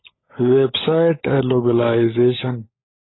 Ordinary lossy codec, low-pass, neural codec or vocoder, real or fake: AAC, 16 kbps; 7.2 kHz; codec, 16 kHz, 16 kbps, FunCodec, trained on LibriTTS, 50 frames a second; fake